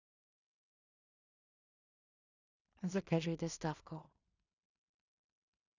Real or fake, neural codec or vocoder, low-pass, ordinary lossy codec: fake; codec, 16 kHz in and 24 kHz out, 0.4 kbps, LongCat-Audio-Codec, two codebook decoder; 7.2 kHz; none